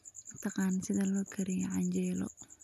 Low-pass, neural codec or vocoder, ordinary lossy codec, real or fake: 14.4 kHz; none; none; real